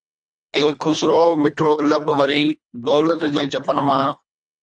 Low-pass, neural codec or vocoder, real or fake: 9.9 kHz; codec, 24 kHz, 1.5 kbps, HILCodec; fake